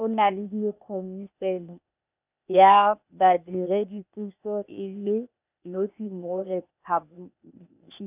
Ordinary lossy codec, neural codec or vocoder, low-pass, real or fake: none; codec, 16 kHz, 0.8 kbps, ZipCodec; 3.6 kHz; fake